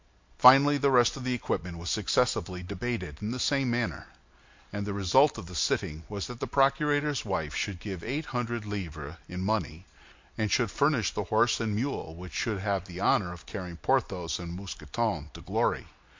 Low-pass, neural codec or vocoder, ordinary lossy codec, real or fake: 7.2 kHz; none; MP3, 48 kbps; real